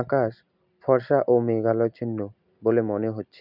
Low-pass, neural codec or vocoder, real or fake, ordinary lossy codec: 5.4 kHz; none; real; none